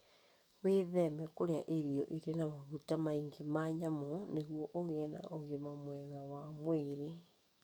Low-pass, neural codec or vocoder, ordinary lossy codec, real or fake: none; codec, 44.1 kHz, 7.8 kbps, DAC; none; fake